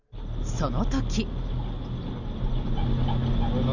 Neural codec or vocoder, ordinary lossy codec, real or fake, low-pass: none; none; real; 7.2 kHz